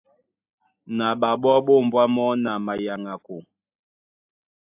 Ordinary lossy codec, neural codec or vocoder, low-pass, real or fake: AAC, 32 kbps; none; 3.6 kHz; real